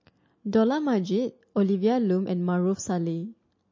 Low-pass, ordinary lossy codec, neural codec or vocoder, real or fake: 7.2 kHz; MP3, 32 kbps; none; real